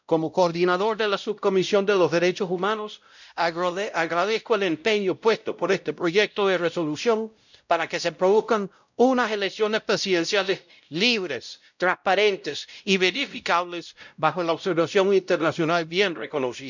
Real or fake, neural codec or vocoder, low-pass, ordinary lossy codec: fake; codec, 16 kHz, 0.5 kbps, X-Codec, WavLM features, trained on Multilingual LibriSpeech; 7.2 kHz; none